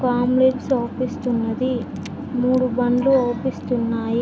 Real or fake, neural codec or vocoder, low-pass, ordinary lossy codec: real; none; none; none